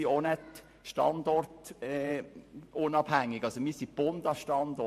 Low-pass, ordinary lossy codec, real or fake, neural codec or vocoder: 14.4 kHz; MP3, 64 kbps; fake; vocoder, 44.1 kHz, 128 mel bands every 512 samples, BigVGAN v2